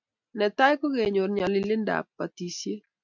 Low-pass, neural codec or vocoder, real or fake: 7.2 kHz; none; real